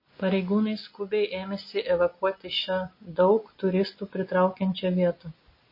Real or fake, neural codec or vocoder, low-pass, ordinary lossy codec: real; none; 5.4 kHz; MP3, 24 kbps